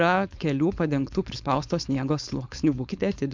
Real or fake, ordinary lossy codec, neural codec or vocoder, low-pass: fake; MP3, 64 kbps; codec, 16 kHz, 4.8 kbps, FACodec; 7.2 kHz